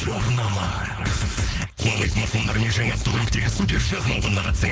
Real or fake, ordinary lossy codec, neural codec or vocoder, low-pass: fake; none; codec, 16 kHz, 4.8 kbps, FACodec; none